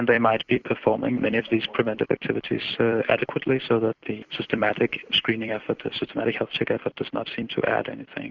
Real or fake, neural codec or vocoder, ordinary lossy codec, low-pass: fake; vocoder, 44.1 kHz, 128 mel bands every 256 samples, BigVGAN v2; Opus, 64 kbps; 7.2 kHz